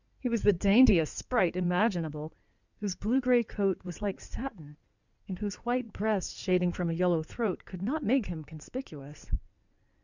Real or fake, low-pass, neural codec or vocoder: fake; 7.2 kHz; codec, 16 kHz in and 24 kHz out, 2.2 kbps, FireRedTTS-2 codec